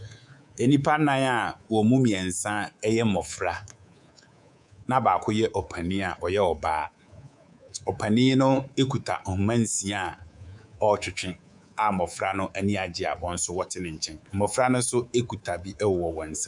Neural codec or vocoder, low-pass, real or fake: codec, 24 kHz, 3.1 kbps, DualCodec; 10.8 kHz; fake